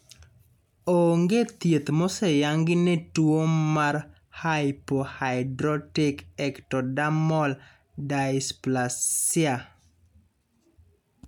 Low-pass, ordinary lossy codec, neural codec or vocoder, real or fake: 19.8 kHz; none; none; real